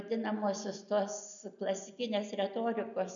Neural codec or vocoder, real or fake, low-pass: none; real; 7.2 kHz